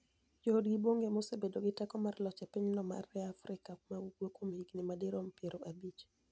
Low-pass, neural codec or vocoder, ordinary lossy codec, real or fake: none; none; none; real